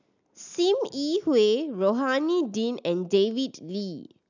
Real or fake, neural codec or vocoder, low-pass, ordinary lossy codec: real; none; 7.2 kHz; none